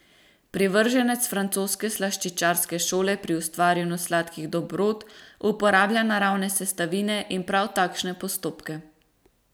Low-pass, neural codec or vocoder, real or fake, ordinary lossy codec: none; none; real; none